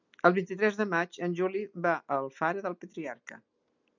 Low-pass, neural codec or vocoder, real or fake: 7.2 kHz; none; real